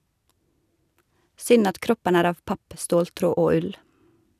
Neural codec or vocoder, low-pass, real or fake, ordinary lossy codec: none; 14.4 kHz; real; none